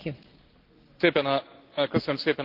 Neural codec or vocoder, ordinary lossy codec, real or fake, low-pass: none; Opus, 16 kbps; real; 5.4 kHz